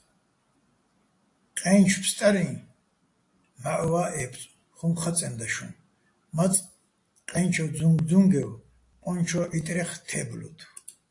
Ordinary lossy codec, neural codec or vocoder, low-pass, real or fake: AAC, 48 kbps; none; 10.8 kHz; real